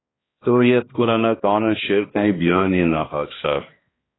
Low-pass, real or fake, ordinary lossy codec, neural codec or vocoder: 7.2 kHz; fake; AAC, 16 kbps; codec, 16 kHz, 1 kbps, X-Codec, HuBERT features, trained on balanced general audio